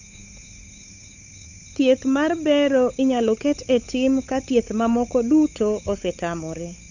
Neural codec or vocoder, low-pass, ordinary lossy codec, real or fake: codec, 16 kHz, 8 kbps, FunCodec, trained on Chinese and English, 25 frames a second; 7.2 kHz; none; fake